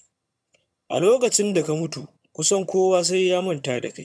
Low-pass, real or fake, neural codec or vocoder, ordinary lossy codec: none; fake; vocoder, 22.05 kHz, 80 mel bands, HiFi-GAN; none